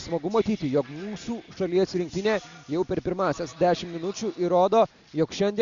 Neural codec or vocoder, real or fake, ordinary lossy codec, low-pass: none; real; Opus, 64 kbps; 7.2 kHz